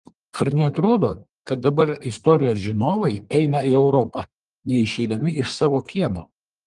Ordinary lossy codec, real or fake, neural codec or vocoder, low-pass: Opus, 24 kbps; fake; codec, 24 kHz, 1 kbps, SNAC; 10.8 kHz